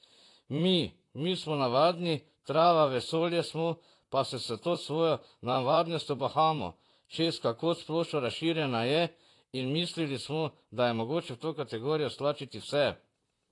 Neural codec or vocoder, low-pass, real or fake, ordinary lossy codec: vocoder, 44.1 kHz, 128 mel bands, Pupu-Vocoder; 10.8 kHz; fake; AAC, 48 kbps